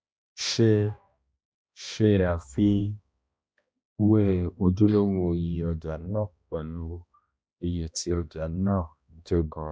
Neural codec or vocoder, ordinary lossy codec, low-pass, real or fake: codec, 16 kHz, 1 kbps, X-Codec, HuBERT features, trained on balanced general audio; none; none; fake